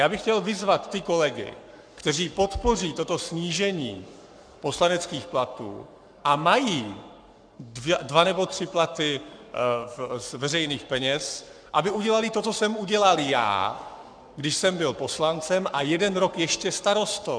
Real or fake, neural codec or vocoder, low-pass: fake; codec, 44.1 kHz, 7.8 kbps, Pupu-Codec; 9.9 kHz